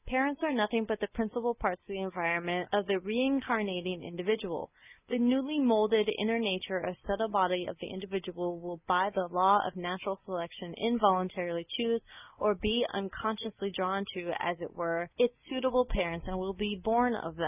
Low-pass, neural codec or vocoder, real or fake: 3.6 kHz; none; real